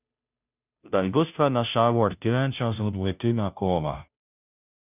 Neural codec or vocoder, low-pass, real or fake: codec, 16 kHz, 0.5 kbps, FunCodec, trained on Chinese and English, 25 frames a second; 3.6 kHz; fake